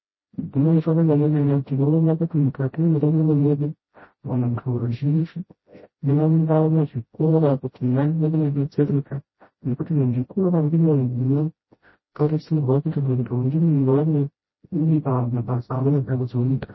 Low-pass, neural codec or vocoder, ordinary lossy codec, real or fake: 7.2 kHz; codec, 16 kHz, 0.5 kbps, FreqCodec, smaller model; MP3, 24 kbps; fake